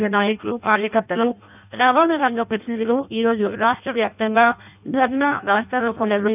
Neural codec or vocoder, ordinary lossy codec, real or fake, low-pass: codec, 16 kHz in and 24 kHz out, 0.6 kbps, FireRedTTS-2 codec; none; fake; 3.6 kHz